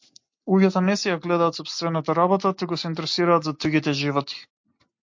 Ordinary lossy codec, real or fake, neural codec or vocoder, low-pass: MP3, 48 kbps; real; none; 7.2 kHz